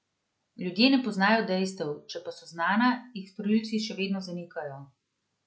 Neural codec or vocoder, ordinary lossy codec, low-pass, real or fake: none; none; none; real